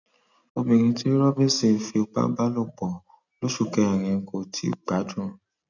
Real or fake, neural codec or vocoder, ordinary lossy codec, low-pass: real; none; none; 7.2 kHz